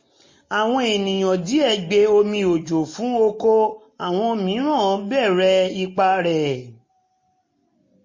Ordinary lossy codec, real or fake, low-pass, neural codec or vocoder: MP3, 32 kbps; real; 7.2 kHz; none